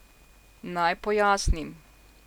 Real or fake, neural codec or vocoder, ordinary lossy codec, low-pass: real; none; none; 19.8 kHz